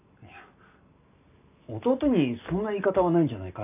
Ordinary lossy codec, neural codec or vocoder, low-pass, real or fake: AAC, 32 kbps; none; 3.6 kHz; real